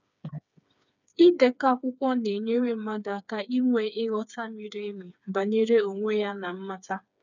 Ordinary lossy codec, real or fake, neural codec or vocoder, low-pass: none; fake; codec, 16 kHz, 4 kbps, FreqCodec, smaller model; 7.2 kHz